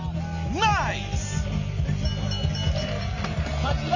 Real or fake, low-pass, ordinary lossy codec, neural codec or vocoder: real; 7.2 kHz; none; none